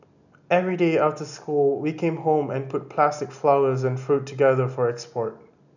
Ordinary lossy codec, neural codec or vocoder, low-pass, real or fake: none; none; 7.2 kHz; real